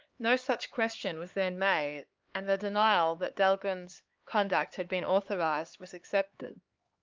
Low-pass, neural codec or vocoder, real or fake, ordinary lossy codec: 7.2 kHz; codec, 16 kHz, 4 kbps, X-Codec, HuBERT features, trained on LibriSpeech; fake; Opus, 24 kbps